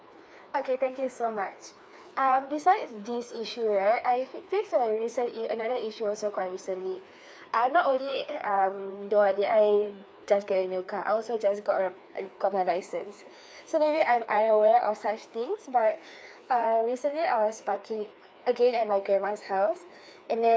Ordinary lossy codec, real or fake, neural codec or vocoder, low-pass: none; fake; codec, 16 kHz, 2 kbps, FreqCodec, larger model; none